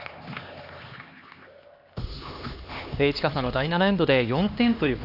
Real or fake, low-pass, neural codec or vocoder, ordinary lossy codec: fake; 5.4 kHz; codec, 16 kHz, 2 kbps, X-Codec, HuBERT features, trained on LibriSpeech; Opus, 64 kbps